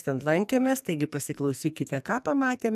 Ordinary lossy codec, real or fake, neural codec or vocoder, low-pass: AAC, 96 kbps; fake; codec, 44.1 kHz, 2.6 kbps, SNAC; 14.4 kHz